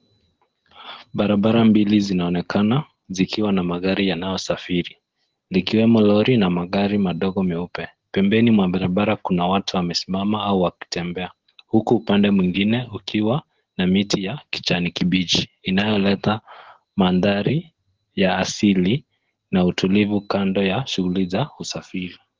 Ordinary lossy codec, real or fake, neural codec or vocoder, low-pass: Opus, 16 kbps; real; none; 7.2 kHz